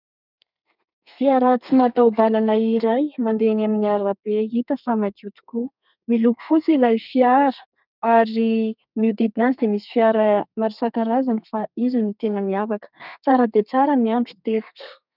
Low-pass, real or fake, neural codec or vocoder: 5.4 kHz; fake; codec, 32 kHz, 1.9 kbps, SNAC